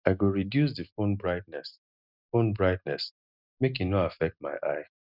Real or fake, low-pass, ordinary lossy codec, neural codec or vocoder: real; 5.4 kHz; none; none